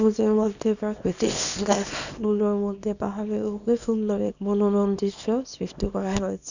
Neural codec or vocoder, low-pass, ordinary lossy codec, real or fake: codec, 24 kHz, 0.9 kbps, WavTokenizer, small release; 7.2 kHz; none; fake